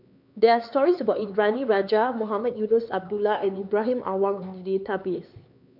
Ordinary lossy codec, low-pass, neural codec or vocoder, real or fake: none; 5.4 kHz; codec, 16 kHz, 4 kbps, X-Codec, HuBERT features, trained on LibriSpeech; fake